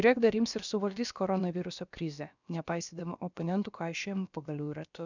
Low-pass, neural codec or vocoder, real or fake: 7.2 kHz; codec, 16 kHz, about 1 kbps, DyCAST, with the encoder's durations; fake